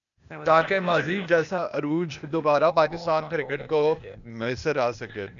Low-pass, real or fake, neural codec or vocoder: 7.2 kHz; fake; codec, 16 kHz, 0.8 kbps, ZipCodec